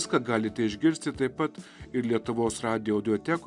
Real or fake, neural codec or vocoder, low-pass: real; none; 10.8 kHz